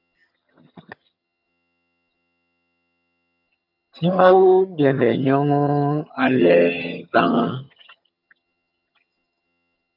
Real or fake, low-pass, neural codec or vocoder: fake; 5.4 kHz; vocoder, 22.05 kHz, 80 mel bands, HiFi-GAN